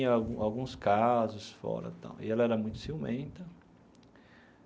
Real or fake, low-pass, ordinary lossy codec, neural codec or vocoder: real; none; none; none